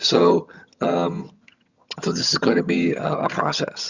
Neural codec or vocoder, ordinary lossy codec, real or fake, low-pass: vocoder, 22.05 kHz, 80 mel bands, HiFi-GAN; Opus, 64 kbps; fake; 7.2 kHz